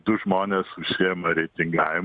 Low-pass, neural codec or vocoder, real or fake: 9.9 kHz; none; real